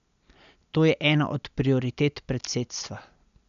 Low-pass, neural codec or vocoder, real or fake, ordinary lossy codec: 7.2 kHz; none; real; none